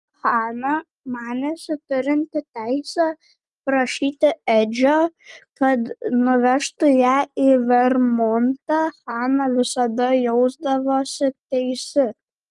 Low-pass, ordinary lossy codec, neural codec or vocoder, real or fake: 10.8 kHz; Opus, 32 kbps; none; real